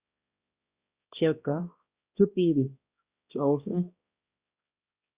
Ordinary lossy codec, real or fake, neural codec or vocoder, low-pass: Opus, 64 kbps; fake; codec, 16 kHz, 1 kbps, X-Codec, HuBERT features, trained on balanced general audio; 3.6 kHz